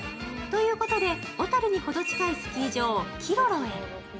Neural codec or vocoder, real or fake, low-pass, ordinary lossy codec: none; real; none; none